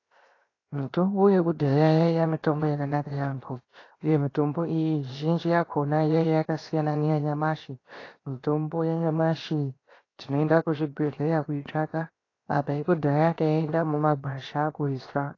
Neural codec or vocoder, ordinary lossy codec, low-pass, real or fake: codec, 16 kHz, 0.7 kbps, FocalCodec; AAC, 32 kbps; 7.2 kHz; fake